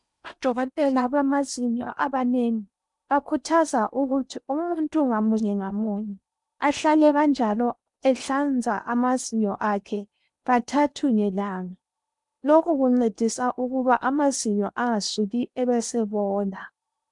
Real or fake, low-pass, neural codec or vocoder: fake; 10.8 kHz; codec, 16 kHz in and 24 kHz out, 0.8 kbps, FocalCodec, streaming, 65536 codes